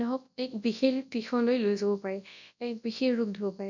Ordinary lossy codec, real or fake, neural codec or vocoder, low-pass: none; fake; codec, 24 kHz, 0.9 kbps, WavTokenizer, large speech release; 7.2 kHz